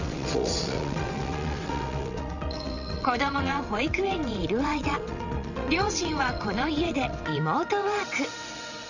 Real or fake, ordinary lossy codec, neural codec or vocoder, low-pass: fake; none; vocoder, 22.05 kHz, 80 mel bands, WaveNeXt; 7.2 kHz